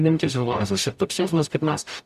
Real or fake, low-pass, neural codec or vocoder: fake; 14.4 kHz; codec, 44.1 kHz, 0.9 kbps, DAC